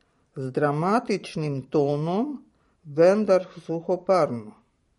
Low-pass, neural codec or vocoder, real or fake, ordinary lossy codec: 19.8 kHz; vocoder, 44.1 kHz, 128 mel bands every 512 samples, BigVGAN v2; fake; MP3, 48 kbps